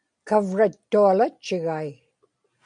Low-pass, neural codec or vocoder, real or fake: 9.9 kHz; none; real